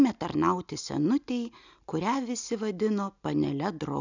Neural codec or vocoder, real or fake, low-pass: none; real; 7.2 kHz